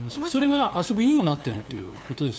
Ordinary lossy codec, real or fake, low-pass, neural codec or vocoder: none; fake; none; codec, 16 kHz, 2 kbps, FunCodec, trained on LibriTTS, 25 frames a second